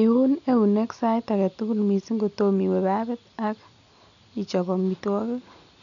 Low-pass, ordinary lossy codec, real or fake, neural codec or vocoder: 7.2 kHz; none; real; none